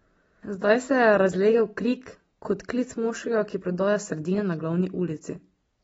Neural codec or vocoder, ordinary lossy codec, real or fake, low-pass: none; AAC, 24 kbps; real; 19.8 kHz